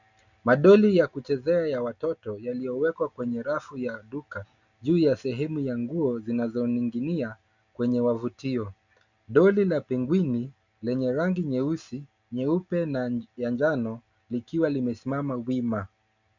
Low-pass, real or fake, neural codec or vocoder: 7.2 kHz; real; none